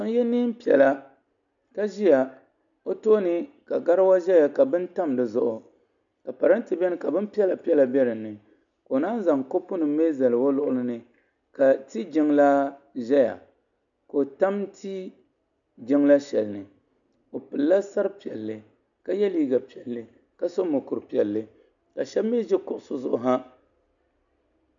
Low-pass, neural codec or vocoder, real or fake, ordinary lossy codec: 7.2 kHz; none; real; MP3, 96 kbps